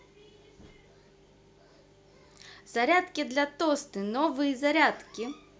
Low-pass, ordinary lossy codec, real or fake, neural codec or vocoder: none; none; real; none